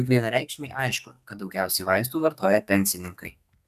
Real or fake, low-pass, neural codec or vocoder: fake; 14.4 kHz; codec, 32 kHz, 1.9 kbps, SNAC